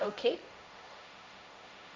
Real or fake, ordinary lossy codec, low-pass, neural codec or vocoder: fake; none; none; codec, 16 kHz, 1.1 kbps, Voila-Tokenizer